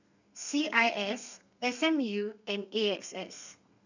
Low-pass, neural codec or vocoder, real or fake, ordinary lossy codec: 7.2 kHz; codec, 32 kHz, 1.9 kbps, SNAC; fake; none